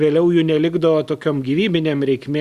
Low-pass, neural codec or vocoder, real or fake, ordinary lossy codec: 14.4 kHz; none; real; Opus, 64 kbps